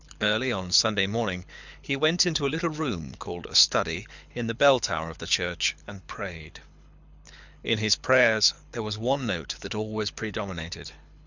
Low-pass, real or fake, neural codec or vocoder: 7.2 kHz; fake; codec, 24 kHz, 6 kbps, HILCodec